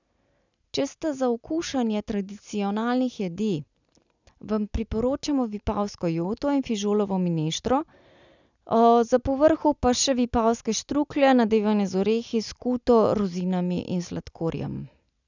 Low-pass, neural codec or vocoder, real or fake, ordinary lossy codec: 7.2 kHz; none; real; none